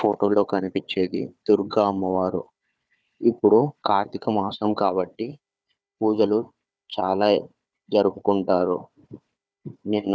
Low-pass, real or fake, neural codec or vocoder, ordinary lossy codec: none; fake; codec, 16 kHz, 4 kbps, FunCodec, trained on Chinese and English, 50 frames a second; none